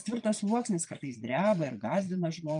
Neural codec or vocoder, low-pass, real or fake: vocoder, 22.05 kHz, 80 mel bands, Vocos; 9.9 kHz; fake